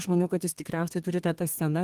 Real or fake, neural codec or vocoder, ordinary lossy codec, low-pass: fake; codec, 32 kHz, 1.9 kbps, SNAC; Opus, 24 kbps; 14.4 kHz